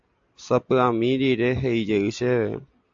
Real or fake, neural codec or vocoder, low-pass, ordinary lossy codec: real; none; 7.2 kHz; AAC, 64 kbps